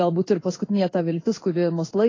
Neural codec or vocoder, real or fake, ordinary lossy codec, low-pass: codec, 16 kHz in and 24 kHz out, 1 kbps, XY-Tokenizer; fake; AAC, 32 kbps; 7.2 kHz